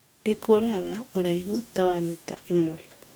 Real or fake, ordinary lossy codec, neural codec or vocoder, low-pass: fake; none; codec, 44.1 kHz, 2.6 kbps, DAC; none